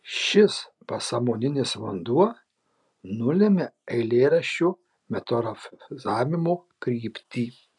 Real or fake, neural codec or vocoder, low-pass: real; none; 10.8 kHz